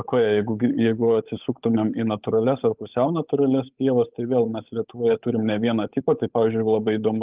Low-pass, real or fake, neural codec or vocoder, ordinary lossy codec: 3.6 kHz; fake; codec, 16 kHz, 8 kbps, FunCodec, trained on Chinese and English, 25 frames a second; Opus, 32 kbps